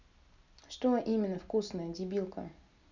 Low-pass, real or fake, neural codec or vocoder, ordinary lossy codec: 7.2 kHz; real; none; none